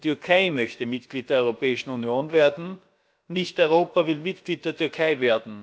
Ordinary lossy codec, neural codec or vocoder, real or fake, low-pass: none; codec, 16 kHz, about 1 kbps, DyCAST, with the encoder's durations; fake; none